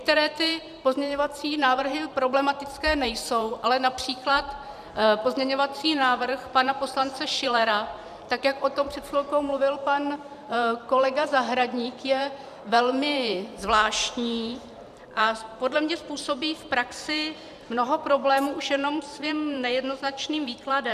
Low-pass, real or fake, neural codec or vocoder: 14.4 kHz; fake; vocoder, 44.1 kHz, 128 mel bands every 512 samples, BigVGAN v2